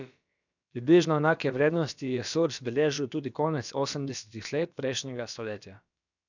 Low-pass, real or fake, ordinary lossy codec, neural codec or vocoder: 7.2 kHz; fake; none; codec, 16 kHz, about 1 kbps, DyCAST, with the encoder's durations